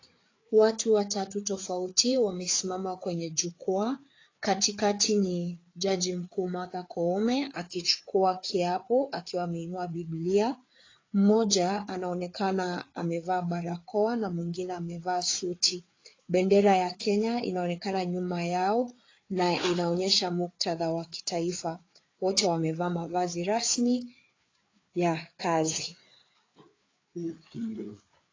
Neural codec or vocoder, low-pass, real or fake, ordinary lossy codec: codec, 16 kHz, 4 kbps, FreqCodec, larger model; 7.2 kHz; fake; AAC, 32 kbps